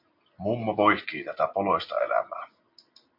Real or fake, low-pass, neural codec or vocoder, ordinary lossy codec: real; 5.4 kHz; none; MP3, 48 kbps